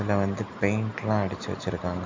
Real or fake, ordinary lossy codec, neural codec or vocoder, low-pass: real; MP3, 48 kbps; none; 7.2 kHz